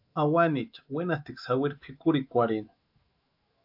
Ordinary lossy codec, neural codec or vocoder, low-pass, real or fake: AAC, 48 kbps; autoencoder, 48 kHz, 128 numbers a frame, DAC-VAE, trained on Japanese speech; 5.4 kHz; fake